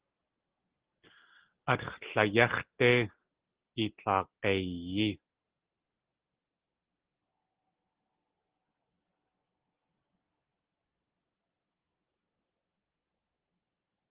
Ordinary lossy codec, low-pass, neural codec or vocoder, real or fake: Opus, 16 kbps; 3.6 kHz; none; real